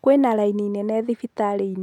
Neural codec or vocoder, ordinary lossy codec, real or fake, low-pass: none; none; real; 19.8 kHz